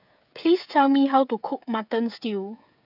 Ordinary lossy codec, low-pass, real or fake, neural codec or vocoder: none; 5.4 kHz; fake; codec, 16 kHz, 16 kbps, FreqCodec, smaller model